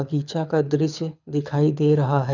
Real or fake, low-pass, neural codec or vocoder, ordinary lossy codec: fake; 7.2 kHz; codec, 24 kHz, 6 kbps, HILCodec; none